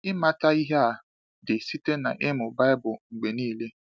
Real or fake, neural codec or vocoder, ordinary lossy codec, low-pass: real; none; none; none